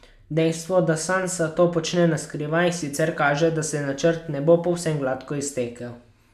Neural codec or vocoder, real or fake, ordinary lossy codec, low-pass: none; real; none; 14.4 kHz